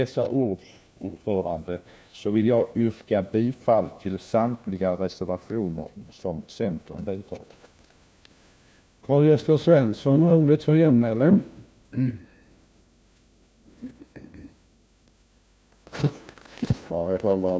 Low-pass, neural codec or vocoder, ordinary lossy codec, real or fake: none; codec, 16 kHz, 1 kbps, FunCodec, trained on LibriTTS, 50 frames a second; none; fake